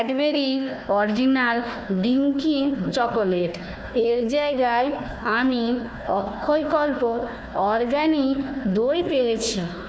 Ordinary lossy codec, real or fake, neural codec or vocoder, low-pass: none; fake; codec, 16 kHz, 1 kbps, FunCodec, trained on Chinese and English, 50 frames a second; none